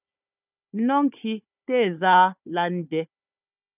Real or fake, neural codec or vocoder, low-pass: fake; codec, 16 kHz, 4 kbps, FunCodec, trained on Chinese and English, 50 frames a second; 3.6 kHz